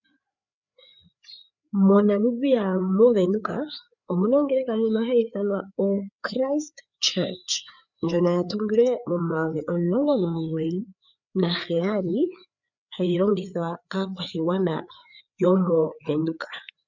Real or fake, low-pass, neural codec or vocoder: fake; 7.2 kHz; codec, 16 kHz, 4 kbps, FreqCodec, larger model